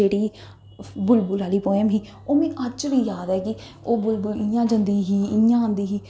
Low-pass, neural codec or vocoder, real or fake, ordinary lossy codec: none; none; real; none